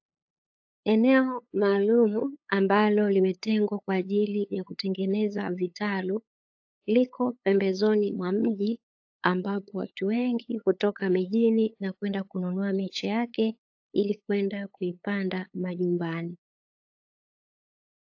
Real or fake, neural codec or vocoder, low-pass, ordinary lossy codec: fake; codec, 16 kHz, 8 kbps, FunCodec, trained on LibriTTS, 25 frames a second; 7.2 kHz; AAC, 48 kbps